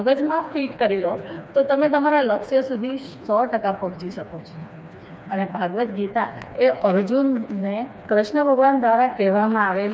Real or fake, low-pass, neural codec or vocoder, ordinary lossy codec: fake; none; codec, 16 kHz, 2 kbps, FreqCodec, smaller model; none